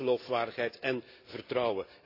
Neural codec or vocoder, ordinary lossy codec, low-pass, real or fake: none; AAC, 32 kbps; 5.4 kHz; real